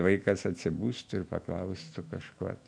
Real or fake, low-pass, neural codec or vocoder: fake; 9.9 kHz; autoencoder, 48 kHz, 128 numbers a frame, DAC-VAE, trained on Japanese speech